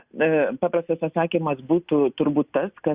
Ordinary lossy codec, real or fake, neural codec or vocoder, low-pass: Opus, 64 kbps; real; none; 3.6 kHz